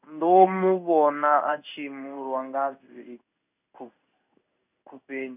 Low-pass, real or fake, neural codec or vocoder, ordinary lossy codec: 3.6 kHz; fake; codec, 16 kHz in and 24 kHz out, 1 kbps, XY-Tokenizer; none